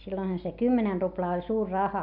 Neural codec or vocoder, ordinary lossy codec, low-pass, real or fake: none; none; 5.4 kHz; real